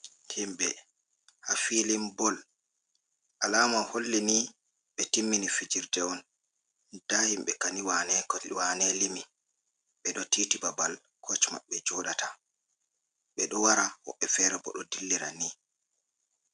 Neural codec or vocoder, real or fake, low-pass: none; real; 9.9 kHz